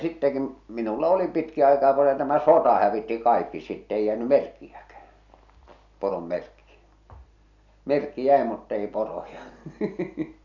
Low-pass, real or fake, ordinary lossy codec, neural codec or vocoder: 7.2 kHz; real; none; none